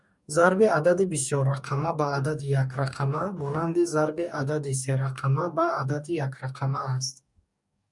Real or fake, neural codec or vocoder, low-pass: fake; codec, 44.1 kHz, 2.6 kbps, DAC; 10.8 kHz